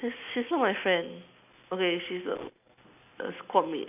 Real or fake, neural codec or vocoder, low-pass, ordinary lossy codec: real; none; 3.6 kHz; none